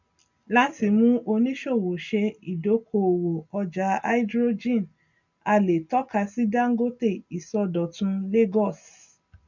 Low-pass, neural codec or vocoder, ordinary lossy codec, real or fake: 7.2 kHz; none; none; real